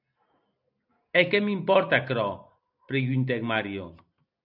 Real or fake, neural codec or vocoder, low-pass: real; none; 5.4 kHz